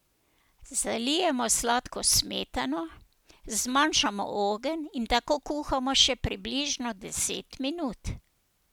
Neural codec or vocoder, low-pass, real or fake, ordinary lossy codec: none; none; real; none